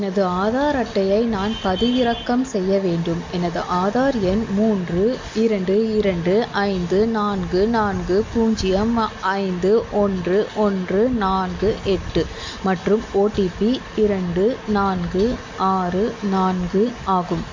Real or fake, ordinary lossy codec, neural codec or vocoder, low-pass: real; MP3, 48 kbps; none; 7.2 kHz